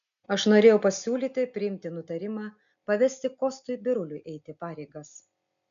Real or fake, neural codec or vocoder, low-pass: real; none; 7.2 kHz